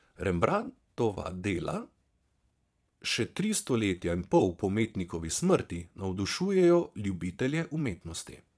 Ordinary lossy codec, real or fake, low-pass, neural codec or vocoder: none; fake; none; vocoder, 22.05 kHz, 80 mel bands, Vocos